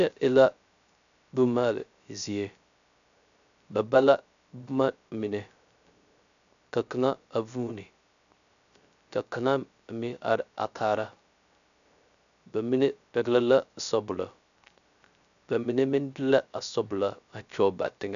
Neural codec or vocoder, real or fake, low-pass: codec, 16 kHz, 0.3 kbps, FocalCodec; fake; 7.2 kHz